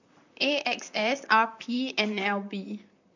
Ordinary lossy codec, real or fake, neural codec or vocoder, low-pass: none; fake; vocoder, 44.1 kHz, 128 mel bands, Pupu-Vocoder; 7.2 kHz